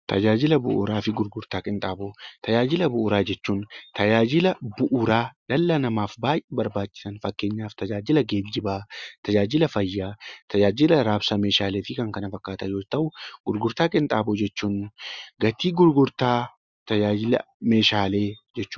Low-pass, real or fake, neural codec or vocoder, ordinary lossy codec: 7.2 kHz; real; none; Opus, 64 kbps